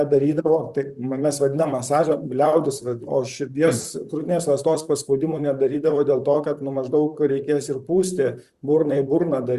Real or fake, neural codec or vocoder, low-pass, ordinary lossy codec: fake; vocoder, 44.1 kHz, 128 mel bands, Pupu-Vocoder; 14.4 kHz; Opus, 64 kbps